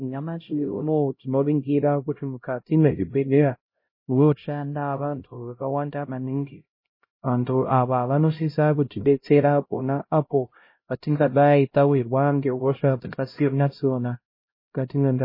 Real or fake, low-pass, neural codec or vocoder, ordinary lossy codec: fake; 5.4 kHz; codec, 16 kHz, 0.5 kbps, X-Codec, HuBERT features, trained on LibriSpeech; MP3, 24 kbps